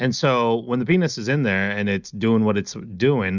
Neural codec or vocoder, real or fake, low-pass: none; real; 7.2 kHz